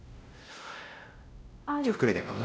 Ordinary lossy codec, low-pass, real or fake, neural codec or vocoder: none; none; fake; codec, 16 kHz, 0.5 kbps, X-Codec, WavLM features, trained on Multilingual LibriSpeech